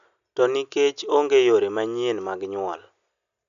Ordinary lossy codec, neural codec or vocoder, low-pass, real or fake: MP3, 96 kbps; none; 7.2 kHz; real